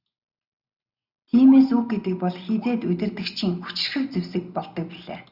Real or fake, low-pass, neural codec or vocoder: real; 5.4 kHz; none